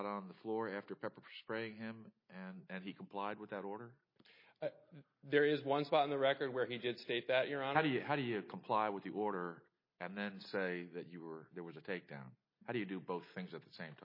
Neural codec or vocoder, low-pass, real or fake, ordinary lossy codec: none; 5.4 kHz; real; MP3, 24 kbps